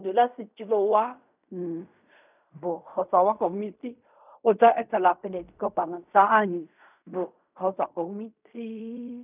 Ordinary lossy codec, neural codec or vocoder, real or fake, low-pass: none; codec, 16 kHz in and 24 kHz out, 0.4 kbps, LongCat-Audio-Codec, fine tuned four codebook decoder; fake; 3.6 kHz